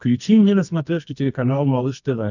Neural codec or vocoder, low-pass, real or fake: codec, 24 kHz, 0.9 kbps, WavTokenizer, medium music audio release; 7.2 kHz; fake